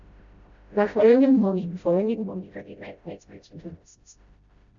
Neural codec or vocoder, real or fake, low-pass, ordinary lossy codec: codec, 16 kHz, 0.5 kbps, FreqCodec, smaller model; fake; 7.2 kHz; Opus, 64 kbps